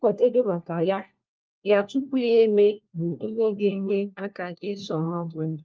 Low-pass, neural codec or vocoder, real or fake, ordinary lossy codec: 7.2 kHz; codec, 16 kHz, 1 kbps, FunCodec, trained on LibriTTS, 50 frames a second; fake; Opus, 24 kbps